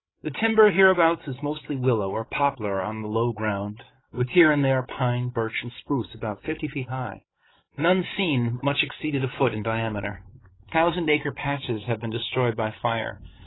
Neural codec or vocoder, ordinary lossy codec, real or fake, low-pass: codec, 16 kHz, 16 kbps, FreqCodec, larger model; AAC, 16 kbps; fake; 7.2 kHz